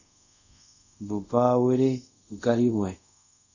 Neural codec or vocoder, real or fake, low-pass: codec, 24 kHz, 0.5 kbps, DualCodec; fake; 7.2 kHz